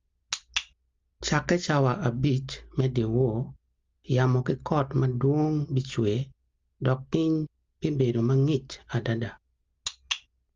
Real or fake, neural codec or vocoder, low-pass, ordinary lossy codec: real; none; 7.2 kHz; Opus, 32 kbps